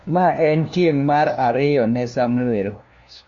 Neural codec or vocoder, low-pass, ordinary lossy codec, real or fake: codec, 16 kHz, 1 kbps, FunCodec, trained on LibriTTS, 50 frames a second; 7.2 kHz; MP3, 48 kbps; fake